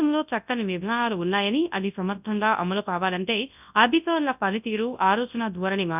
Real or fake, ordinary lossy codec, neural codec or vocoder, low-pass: fake; none; codec, 24 kHz, 0.9 kbps, WavTokenizer, large speech release; 3.6 kHz